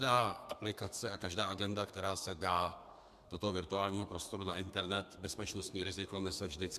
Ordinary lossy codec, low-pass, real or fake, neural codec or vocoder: MP3, 96 kbps; 14.4 kHz; fake; codec, 32 kHz, 1.9 kbps, SNAC